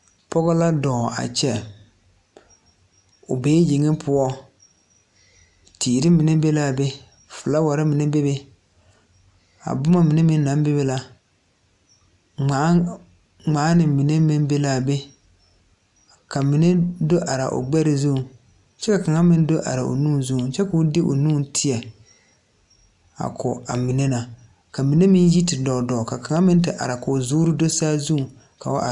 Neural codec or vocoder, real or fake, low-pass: none; real; 10.8 kHz